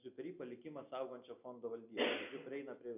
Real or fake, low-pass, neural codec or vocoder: real; 3.6 kHz; none